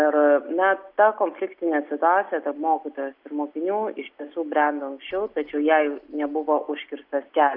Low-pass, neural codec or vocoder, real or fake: 5.4 kHz; none; real